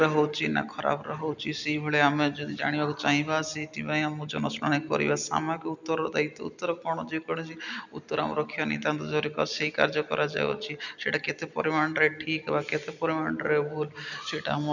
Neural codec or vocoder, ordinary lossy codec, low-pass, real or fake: none; none; 7.2 kHz; real